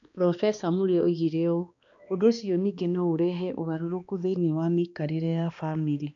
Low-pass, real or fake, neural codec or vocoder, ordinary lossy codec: 7.2 kHz; fake; codec, 16 kHz, 2 kbps, X-Codec, HuBERT features, trained on balanced general audio; AAC, 64 kbps